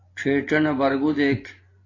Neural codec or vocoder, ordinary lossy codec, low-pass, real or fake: vocoder, 24 kHz, 100 mel bands, Vocos; AAC, 32 kbps; 7.2 kHz; fake